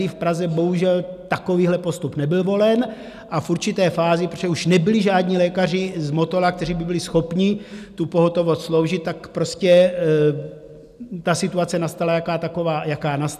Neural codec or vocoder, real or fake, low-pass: none; real; 14.4 kHz